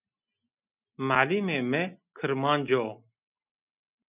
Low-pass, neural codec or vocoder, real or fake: 3.6 kHz; none; real